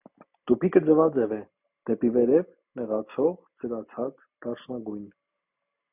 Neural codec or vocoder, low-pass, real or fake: none; 3.6 kHz; real